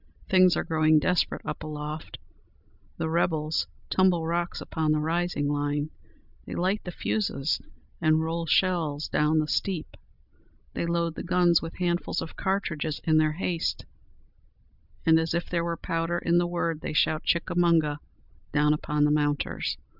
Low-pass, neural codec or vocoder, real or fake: 5.4 kHz; none; real